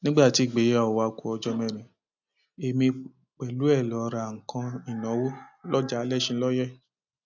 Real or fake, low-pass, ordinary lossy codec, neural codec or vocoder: real; 7.2 kHz; none; none